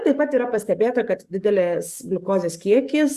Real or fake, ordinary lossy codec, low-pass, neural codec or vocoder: fake; Opus, 64 kbps; 14.4 kHz; codec, 44.1 kHz, 7.8 kbps, DAC